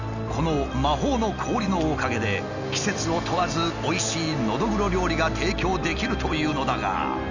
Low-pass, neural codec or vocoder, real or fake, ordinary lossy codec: 7.2 kHz; none; real; none